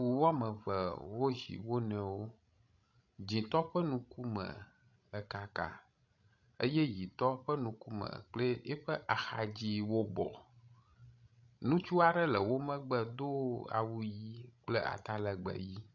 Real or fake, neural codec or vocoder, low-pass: fake; codec, 16 kHz, 16 kbps, FreqCodec, larger model; 7.2 kHz